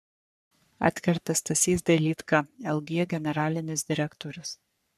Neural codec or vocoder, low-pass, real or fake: codec, 44.1 kHz, 3.4 kbps, Pupu-Codec; 14.4 kHz; fake